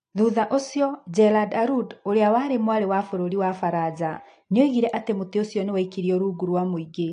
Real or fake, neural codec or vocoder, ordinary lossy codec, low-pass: real; none; AAC, 48 kbps; 9.9 kHz